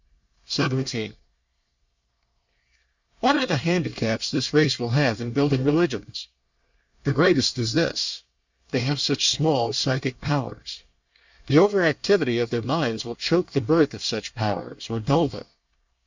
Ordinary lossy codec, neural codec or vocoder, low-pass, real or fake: Opus, 64 kbps; codec, 24 kHz, 1 kbps, SNAC; 7.2 kHz; fake